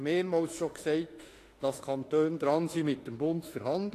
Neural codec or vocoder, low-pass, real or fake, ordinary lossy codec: autoencoder, 48 kHz, 32 numbers a frame, DAC-VAE, trained on Japanese speech; 14.4 kHz; fake; AAC, 48 kbps